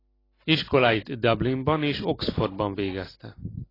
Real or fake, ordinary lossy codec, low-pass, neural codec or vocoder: real; AAC, 24 kbps; 5.4 kHz; none